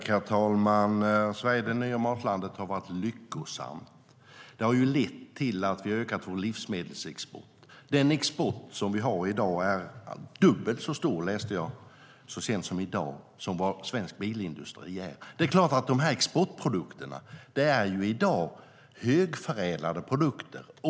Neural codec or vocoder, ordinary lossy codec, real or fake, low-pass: none; none; real; none